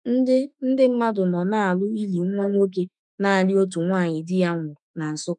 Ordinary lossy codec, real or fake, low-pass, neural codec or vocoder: none; fake; 10.8 kHz; autoencoder, 48 kHz, 32 numbers a frame, DAC-VAE, trained on Japanese speech